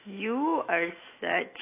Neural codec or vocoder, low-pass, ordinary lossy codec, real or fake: autoencoder, 48 kHz, 128 numbers a frame, DAC-VAE, trained on Japanese speech; 3.6 kHz; AAC, 24 kbps; fake